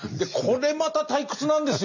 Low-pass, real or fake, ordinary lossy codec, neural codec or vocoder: 7.2 kHz; real; none; none